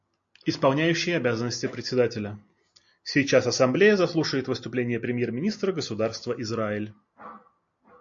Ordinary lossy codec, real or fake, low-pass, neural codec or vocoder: MP3, 48 kbps; real; 7.2 kHz; none